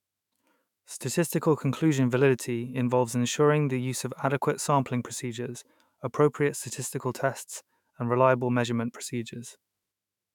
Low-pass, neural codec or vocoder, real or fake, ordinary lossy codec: 19.8 kHz; autoencoder, 48 kHz, 128 numbers a frame, DAC-VAE, trained on Japanese speech; fake; none